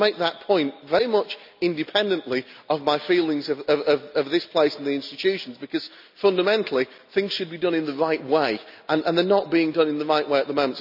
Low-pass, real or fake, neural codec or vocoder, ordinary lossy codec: 5.4 kHz; real; none; none